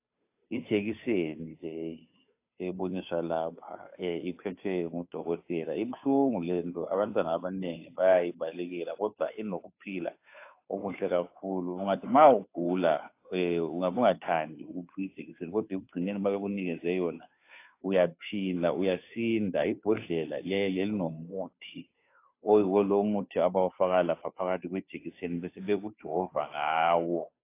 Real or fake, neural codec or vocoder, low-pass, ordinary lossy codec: fake; codec, 16 kHz, 2 kbps, FunCodec, trained on Chinese and English, 25 frames a second; 3.6 kHz; AAC, 24 kbps